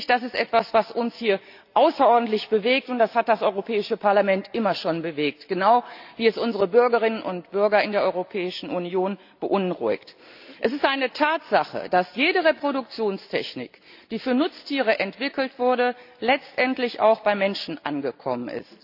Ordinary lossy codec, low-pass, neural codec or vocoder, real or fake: AAC, 48 kbps; 5.4 kHz; none; real